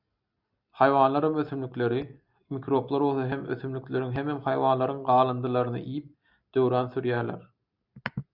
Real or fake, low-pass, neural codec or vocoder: real; 5.4 kHz; none